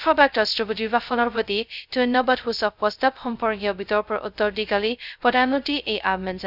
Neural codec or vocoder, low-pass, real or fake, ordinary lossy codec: codec, 16 kHz, 0.2 kbps, FocalCodec; 5.4 kHz; fake; none